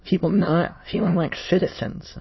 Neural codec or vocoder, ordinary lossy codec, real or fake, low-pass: autoencoder, 22.05 kHz, a latent of 192 numbers a frame, VITS, trained on many speakers; MP3, 24 kbps; fake; 7.2 kHz